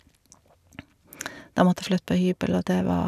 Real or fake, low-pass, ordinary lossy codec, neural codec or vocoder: real; 14.4 kHz; none; none